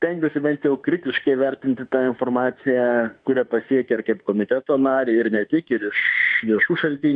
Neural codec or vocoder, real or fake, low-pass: autoencoder, 48 kHz, 32 numbers a frame, DAC-VAE, trained on Japanese speech; fake; 9.9 kHz